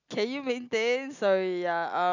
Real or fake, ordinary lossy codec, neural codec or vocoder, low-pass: real; none; none; 7.2 kHz